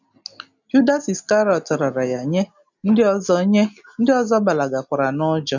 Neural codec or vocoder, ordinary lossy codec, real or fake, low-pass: none; none; real; 7.2 kHz